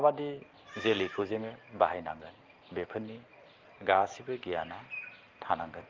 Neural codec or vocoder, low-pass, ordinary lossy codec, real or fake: none; 7.2 kHz; Opus, 32 kbps; real